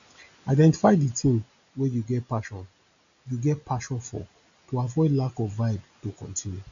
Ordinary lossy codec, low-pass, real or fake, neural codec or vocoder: none; 7.2 kHz; real; none